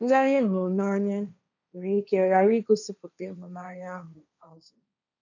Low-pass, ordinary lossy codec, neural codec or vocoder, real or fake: 7.2 kHz; none; codec, 16 kHz, 1.1 kbps, Voila-Tokenizer; fake